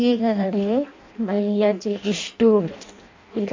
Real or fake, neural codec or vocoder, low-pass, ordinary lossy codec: fake; codec, 16 kHz in and 24 kHz out, 0.6 kbps, FireRedTTS-2 codec; 7.2 kHz; MP3, 48 kbps